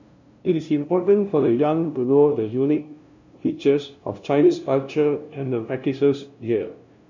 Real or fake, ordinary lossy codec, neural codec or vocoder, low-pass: fake; none; codec, 16 kHz, 0.5 kbps, FunCodec, trained on LibriTTS, 25 frames a second; 7.2 kHz